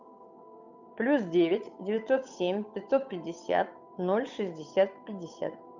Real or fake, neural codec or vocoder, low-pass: fake; codec, 16 kHz, 8 kbps, FunCodec, trained on Chinese and English, 25 frames a second; 7.2 kHz